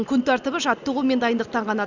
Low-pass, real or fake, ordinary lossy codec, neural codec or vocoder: 7.2 kHz; real; Opus, 64 kbps; none